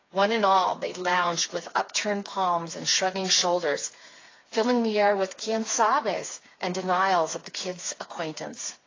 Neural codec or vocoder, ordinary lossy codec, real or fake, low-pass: codec, 16 kHz, 4 kbps, FreqCodec, smaller model; AAC, 32 kbps; fake; 7.2 kHz